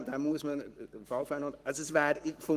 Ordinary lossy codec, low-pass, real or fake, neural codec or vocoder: Opus, 24 kbps; 14.4 kHz; fake; codec, 44.1 kHz, 7.8 kbps, Pupu-Codec